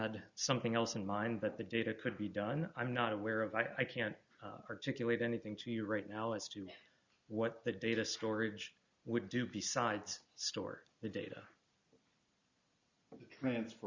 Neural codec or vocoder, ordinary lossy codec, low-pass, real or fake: none; Opus, 64 kbps; 7.2 kHz; real